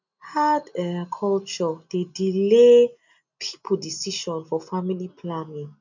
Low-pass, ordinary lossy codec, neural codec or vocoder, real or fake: 7.2 kHz; none; none; real